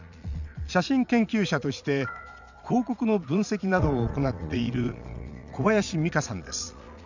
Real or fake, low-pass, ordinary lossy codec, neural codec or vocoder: fake; 7.2 kHz; none; vocoder, 22.05 kHz, 80 mel bands, Vocos